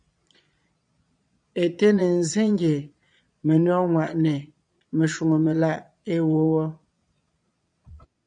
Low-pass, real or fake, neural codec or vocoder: 9.9 kHz; fake; vocoder, 22.05 kHz, 80 mel bands, Vocos